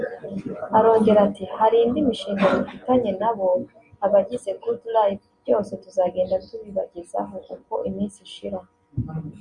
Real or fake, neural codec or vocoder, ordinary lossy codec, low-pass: real; none; Opus, 64 kbps; 10.8 kHz